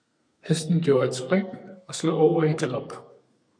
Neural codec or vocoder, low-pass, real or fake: codec, 32 kHz, 1.9 kbps, SNAC; 9.9 kHz; fake